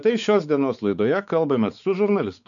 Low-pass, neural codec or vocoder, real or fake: 7.2 kHz; codec, 16 kHz, 4 kbps, X-Codec, WavLM features, trained on Multilingual LibriSpeech; fake